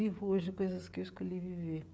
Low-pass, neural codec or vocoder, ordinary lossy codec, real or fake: none; codec, 16 kHz, 16 kbps, FreqCodec, smaller model; none; fake